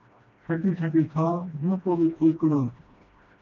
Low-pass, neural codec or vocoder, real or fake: 7.2 kHz; codec, 16 kHz, 1 kbps, FreqCodec, smaller model; fake